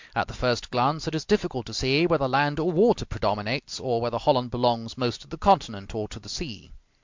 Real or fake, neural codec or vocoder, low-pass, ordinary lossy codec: real; none; 7.2 kHz; MP3, 64 kbps